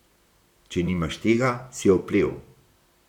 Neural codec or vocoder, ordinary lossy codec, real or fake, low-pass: vocoder, 44.1 kHz, 128 mel bands, Pupu-Vocoder; none; fake; 19.8 kHz